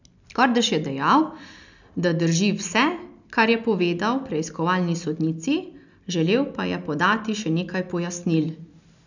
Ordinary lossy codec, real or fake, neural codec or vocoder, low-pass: none; real; none; 7.2 kHz